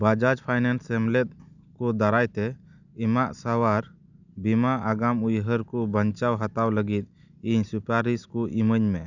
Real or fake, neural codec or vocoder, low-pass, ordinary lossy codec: real; none; 7.2 kHz; none